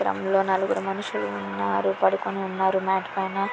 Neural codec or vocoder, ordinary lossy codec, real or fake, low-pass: none; none; real; none